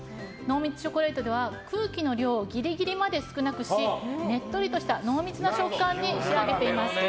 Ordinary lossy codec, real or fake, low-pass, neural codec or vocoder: none; real; none; none